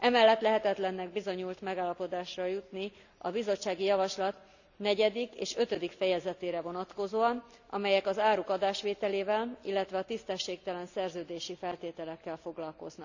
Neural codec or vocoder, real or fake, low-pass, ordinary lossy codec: none; real; 7.2 kHz; none